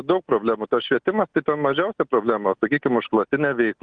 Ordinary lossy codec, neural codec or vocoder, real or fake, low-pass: Opus, 24 kbps; none; real; 9.9 kHz